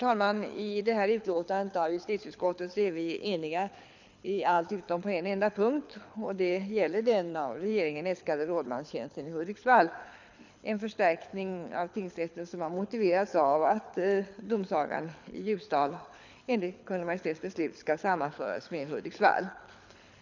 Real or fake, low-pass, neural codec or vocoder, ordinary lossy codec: fake; 7.2 kHz; codec, 24 kHz, 6 kbps, HILCodec; none